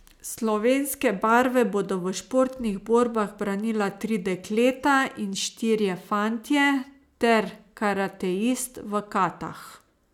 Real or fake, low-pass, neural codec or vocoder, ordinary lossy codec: real; 19.8 kHz; none; none